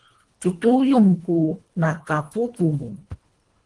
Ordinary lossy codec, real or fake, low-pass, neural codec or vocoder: Opus, 24 kbps; fake; 10.8 kHz; codec, 24 kHz, 1.5 kbps, HILCodec